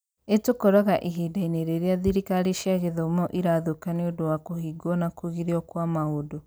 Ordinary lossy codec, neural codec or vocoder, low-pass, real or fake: none; none; none; real